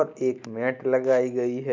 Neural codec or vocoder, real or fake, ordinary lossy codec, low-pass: none; real; MP3, 64 kbps; 7.2 kHz